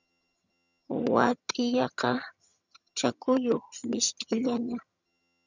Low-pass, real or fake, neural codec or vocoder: 7.2 kHz; fake; vocoder, 22.05 kHz, 80 mel bands, HiFi-GAN